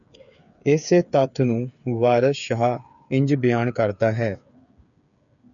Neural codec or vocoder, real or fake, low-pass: codec, 16 kHz, 8 kbps, FreqCodec, smaller model; fake; 7.2 kHz